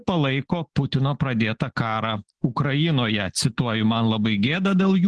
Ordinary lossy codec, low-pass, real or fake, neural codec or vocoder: Opus, 16 kbps; 10.8 kHz; real; none